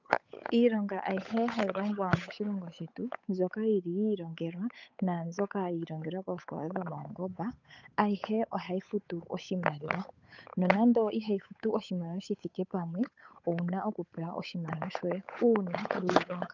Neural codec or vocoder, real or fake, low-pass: codec, 16 kHz, 8 kbps, FunCodec, trained on Chinese and English, 25 frames a second; fake; 7.2 kHz